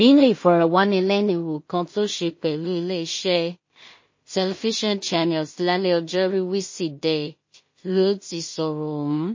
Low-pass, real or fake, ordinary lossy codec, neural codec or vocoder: 7.2 kHz; fake; MP3, 32 kbps; codec, 16 kHz in and 24 kHz out, 0.4 kbps, LongCat-Audio-Codec, two codebook decoder